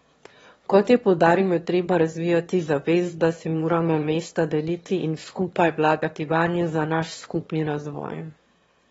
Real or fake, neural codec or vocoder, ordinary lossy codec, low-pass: fake; autoencoder, 22.05 kHz, a latent of 192 numbers a frame, VITS, trained on one speaker; AAC, 24 kbps; 9.9 kHz